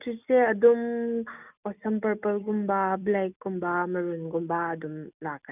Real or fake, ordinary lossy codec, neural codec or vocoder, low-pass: real; none; none; 3.6 kHz